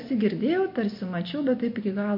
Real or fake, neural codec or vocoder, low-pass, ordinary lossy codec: real; none; 5.4 kHz; MP3, 32 kbps